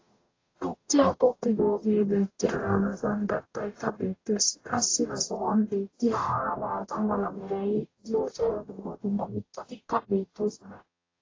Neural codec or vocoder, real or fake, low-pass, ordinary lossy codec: codec, 44.1 kHz, 0.9 kbps, DAC; fake; 7.2 kHz; AAC, 32 kbps